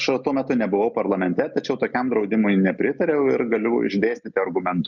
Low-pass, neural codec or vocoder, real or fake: 7.2 kHz; none; real